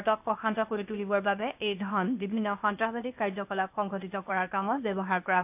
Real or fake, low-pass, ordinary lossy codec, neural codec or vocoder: fake; 3.6 kHz; AAC, 32 kbps; codec, 16 kHz, 0.8 kbps, ZipCodec